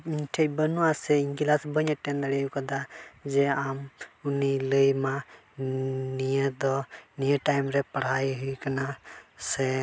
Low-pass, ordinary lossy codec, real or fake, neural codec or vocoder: none; none; real; none